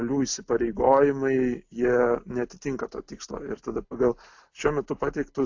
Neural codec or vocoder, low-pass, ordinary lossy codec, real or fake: none; 7.2 kHz; AAC, 48 kbps; real